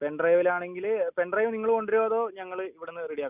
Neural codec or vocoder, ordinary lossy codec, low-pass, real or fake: none; none; 3.6 kHz; real